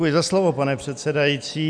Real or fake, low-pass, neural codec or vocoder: real; 9.9 kHz; none